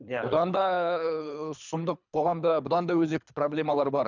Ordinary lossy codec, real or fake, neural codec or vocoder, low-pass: none; fake; codec, 24 kHz, 3 kbps, HILCodec; 7.2 kHz